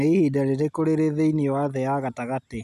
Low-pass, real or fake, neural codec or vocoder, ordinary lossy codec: 14.4 kHz; real; none; none